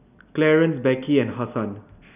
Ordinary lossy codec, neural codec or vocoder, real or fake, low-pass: none; none; real; 3.6 kHz